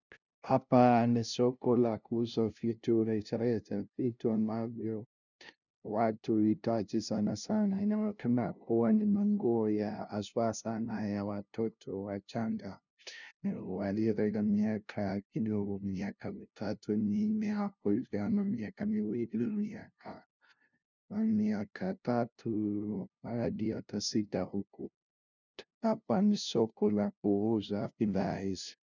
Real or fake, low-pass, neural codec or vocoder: fake; 7.2 kHz; codec, 16 kHz, 0.5 kbps, FunCodec, trained on LibriTTS, 25 frames a second